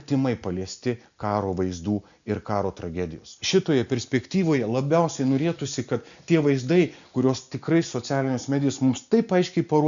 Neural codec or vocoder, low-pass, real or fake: none; 7.2 kHz; real